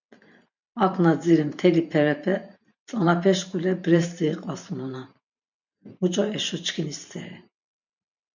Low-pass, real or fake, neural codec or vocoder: 7.2 kHz; fake; vocoder, 44.1 kHz, 128 mel bands every 512 samples, BigVGAN v2